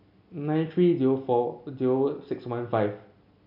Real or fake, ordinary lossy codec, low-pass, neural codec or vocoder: real; none; 5.4 kHz; none